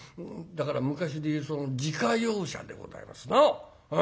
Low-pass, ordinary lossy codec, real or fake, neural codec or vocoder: none; none; real; none